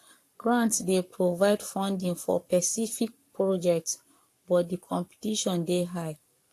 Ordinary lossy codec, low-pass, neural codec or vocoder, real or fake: AAC, 64 kbps; 14.4 kHz; codec, 44.1 kHz, 7.8 kbps, Pupu-Codec; fake